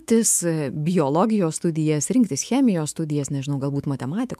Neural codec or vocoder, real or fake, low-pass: autoencoder, 48 kHz, 128 numbers a frame, DAC-VAE, trained on Japanese speech; fake; 14.4 kHz